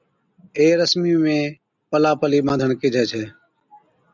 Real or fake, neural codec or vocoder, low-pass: real; none; 7.2 kHz